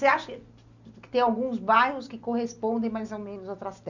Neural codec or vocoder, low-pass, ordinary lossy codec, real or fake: none; 7.2 kHz; none; real